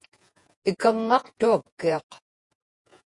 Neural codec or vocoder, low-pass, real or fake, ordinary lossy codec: vocoder, 48 kHz, 128 mel bands, Vocos; 10.8 kHz; fake; MP3, 48 kbps